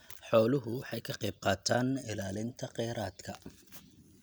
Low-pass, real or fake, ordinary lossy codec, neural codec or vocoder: none; real; none; none